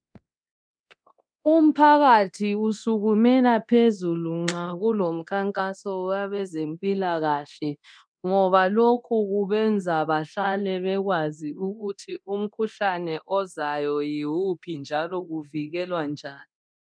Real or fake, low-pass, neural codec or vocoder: fake; 9.9 kHz; codec, 24 kHz, 0.9 kbps, DualCodec